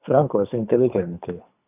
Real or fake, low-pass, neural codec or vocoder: fake; 3.6 kHz; codec, 24 kHz, 3 kbps, HILCodec